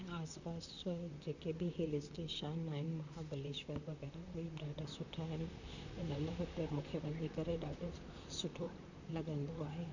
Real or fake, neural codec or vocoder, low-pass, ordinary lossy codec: fake; vocoder, 44.1 kHz, 128 mel bands, Pupu-Vocoder; 7.2 kHz; none